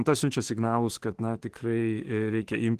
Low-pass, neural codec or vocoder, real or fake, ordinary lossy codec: 14.4 kHz; autoencoder, 48 kHz, 32 numbers a frame, DAC-VAE, trained on Japanese speech; fake; Opus, 16 kbps